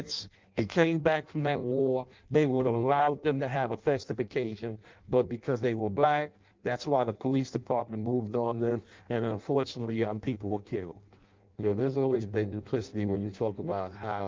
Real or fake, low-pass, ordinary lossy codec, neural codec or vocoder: fake; 7.2 kHz; Opus, 32 kbps; codec, 16 kHz in and 24 kHz out, 0.6 kbps, FireRedTTS-2 codec